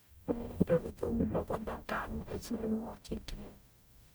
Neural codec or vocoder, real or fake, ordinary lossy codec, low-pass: codec, 44.1 kHz, 0.9 kbps, DAC; fake; none; none